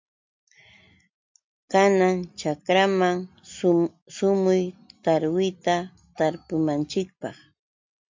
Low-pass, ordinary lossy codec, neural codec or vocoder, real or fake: 7.2 kHz; MP3, 48 kbps; none; real